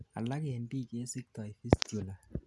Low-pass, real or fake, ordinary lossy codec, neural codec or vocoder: none; real; none; none